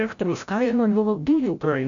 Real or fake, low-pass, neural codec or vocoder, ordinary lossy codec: fake; 7.2 kHz; codec, 16 kHz, 0.5 kbps, FreqCodec, larger model; AAC, 64 kbps